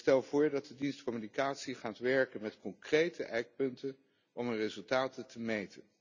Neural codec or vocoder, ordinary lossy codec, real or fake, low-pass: none; none; real; 7.2 kHz